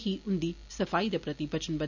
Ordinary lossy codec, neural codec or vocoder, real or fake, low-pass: none; none; real; 7.2 kHz